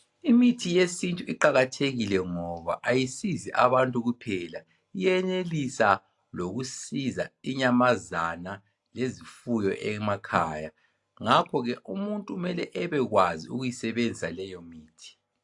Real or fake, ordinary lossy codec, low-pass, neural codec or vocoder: real; AAC, 64 kbps; 10.8 kHz; none